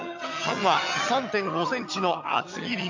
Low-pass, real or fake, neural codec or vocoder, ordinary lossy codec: 7.2 kHz; fake; vocoder, 22.05 kHz, 80 mel bands, HiFi-GAN; MP3, 64 kbps